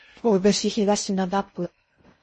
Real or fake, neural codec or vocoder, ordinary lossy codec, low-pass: fake; codec, 16 kHz in and 24 kHz out, 0.6 kbps, FocalCodec, streaming, 4096 codes; MP3, 32 kbps; 10.8 kHz